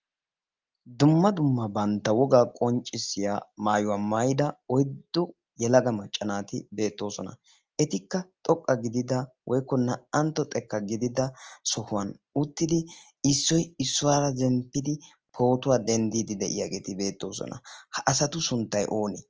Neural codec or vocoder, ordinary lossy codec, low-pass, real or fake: none; Opus, 24 kbps; 7.2 kHz; real